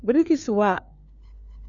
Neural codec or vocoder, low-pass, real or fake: codec, 16 kHz, 4 kbps, FunCodec, trained on LibriTTS, 50 frames a second; 7.2 kHz; fake